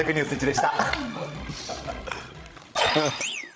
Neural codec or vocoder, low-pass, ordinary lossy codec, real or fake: codec, 16 kHz, 16 kbps, FreqCodec, larger model; none; none; fake